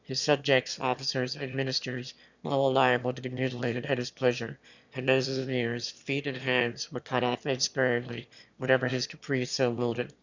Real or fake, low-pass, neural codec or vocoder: fake; 7.2 kHz; autoencoder, 22.05 kHz, a latent of 192 numbers a frame, VITS, trained on one speaker